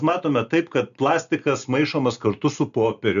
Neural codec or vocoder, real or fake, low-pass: none; real; 7.2 kHz